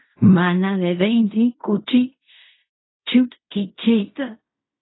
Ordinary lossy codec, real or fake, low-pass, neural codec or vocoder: AAC, 16 kbps; fake; 7.2 kHz; codec, 16 kHz in and 24 kHz out, 0.4 kbps, LongCat-Audio-Codec, fine tuned four codebook decoder